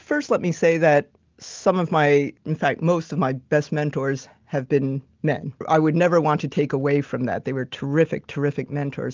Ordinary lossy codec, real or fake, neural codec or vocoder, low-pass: Opus, 32 kbps; real; none; 7.2 kHz